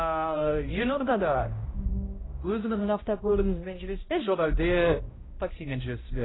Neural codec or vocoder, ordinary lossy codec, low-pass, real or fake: codec, 16 kHz, 0.5 kbps, X-Codec, HuBERT features, trained on balanced general audio; AAC, 16 kbps; 7.2 kHz; fake